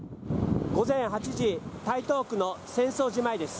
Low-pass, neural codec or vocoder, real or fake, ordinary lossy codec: none; none; real; none